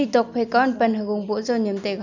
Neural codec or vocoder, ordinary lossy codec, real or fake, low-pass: none; none; real; 7.2 kHz